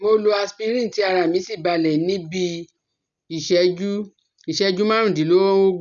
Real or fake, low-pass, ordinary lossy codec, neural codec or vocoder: real; 7.2 kHz; none; none